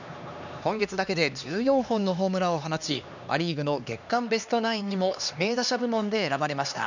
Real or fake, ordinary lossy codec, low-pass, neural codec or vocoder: fake; none; 7.2 kHz; codec, 16 kHz, 2 kbps, X-Codec, HuBERT features, trained on LibriSpeech